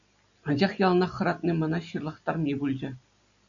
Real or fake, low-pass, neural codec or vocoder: real; 7.2 kHz; none